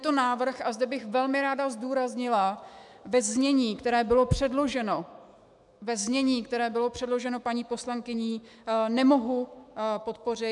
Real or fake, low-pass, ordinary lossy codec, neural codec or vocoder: fake; 10.8 kHz; MP3, 96 kbps; autoencoder, 48 kHz, 128 numbers a frame, DAC-VAE, trained on Japanese speech